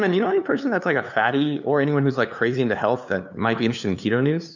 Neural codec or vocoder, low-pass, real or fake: codec, 16 kHz, 2 kbps, FunCodec, trained on LibriTTS, 25 frames a second; 7.2 kHz; fake